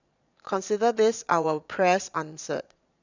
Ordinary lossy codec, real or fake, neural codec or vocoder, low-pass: none; fake; vocoder, 22.05 kHz, 80 mel bands, Vocos; 7.2 kHz